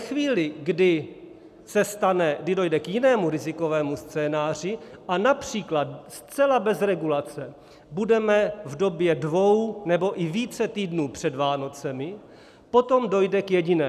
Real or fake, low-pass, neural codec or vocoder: real; 14.4 kHz; none